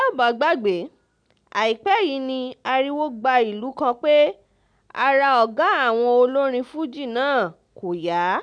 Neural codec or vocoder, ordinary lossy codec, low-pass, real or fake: none; none; 9.9 kHz; real